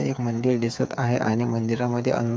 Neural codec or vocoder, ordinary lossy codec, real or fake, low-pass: codec, 16 kHz, 8 kbps, FreqCodec, smaller model; none; fake; none